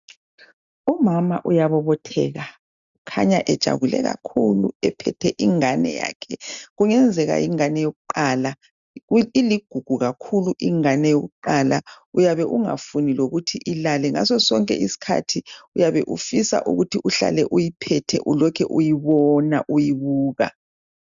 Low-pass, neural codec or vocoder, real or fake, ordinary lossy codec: 7.2 kHz; none; real; MP3, 96 kbps